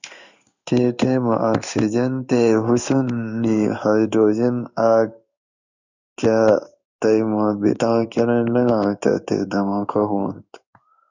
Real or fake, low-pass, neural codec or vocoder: fake; 7.2 kHz; codec, 16 kHz in and 24 kHz out, 1 kbps, XY-Tokenizer